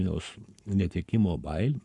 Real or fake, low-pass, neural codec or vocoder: fake; 10.8 kHz; codec, 44.1 kHz, 7.8 kbps, Pupu-Codec